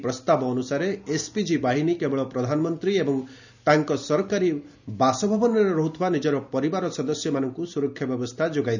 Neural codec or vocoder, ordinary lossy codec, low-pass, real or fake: none; none; 7.2 kHz; real